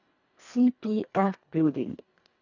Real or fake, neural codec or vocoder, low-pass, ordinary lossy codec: fake; codec, 24 kHz, 1.5 kbps, HILCodec; 7.2 kHz; none